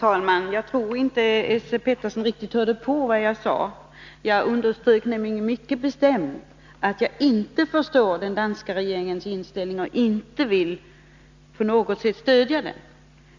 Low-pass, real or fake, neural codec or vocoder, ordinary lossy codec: 7.2 kHz; real; none; Opus, 64 kbps